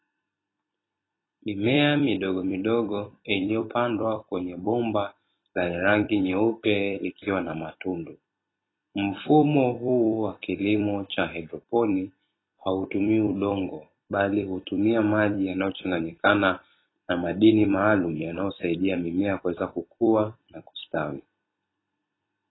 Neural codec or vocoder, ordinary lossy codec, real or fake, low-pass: vocoder, 44.1 kHz, 128 mel bands every 256 samples, BigVGAN v2; AAC, 16 kbps; fake; 7.2 kHz